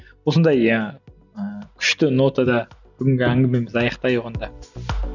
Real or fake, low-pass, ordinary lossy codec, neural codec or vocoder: real; 7.2 kHz; none; none